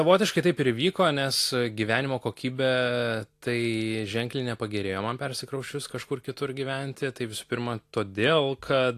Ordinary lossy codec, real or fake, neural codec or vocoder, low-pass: AAC, 64 kbps; real; none; 14.4 kHz